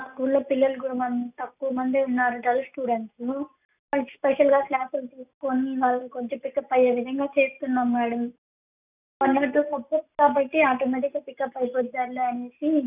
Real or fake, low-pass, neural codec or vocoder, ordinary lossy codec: real; 3.6 kHz; none; none